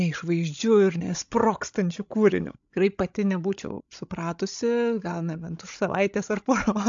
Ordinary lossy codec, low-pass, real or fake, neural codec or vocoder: AAC, 64 kbps; 7.2 kHz; fake; codec, 16 kHz, 16 kbps, FreqCodec, larger model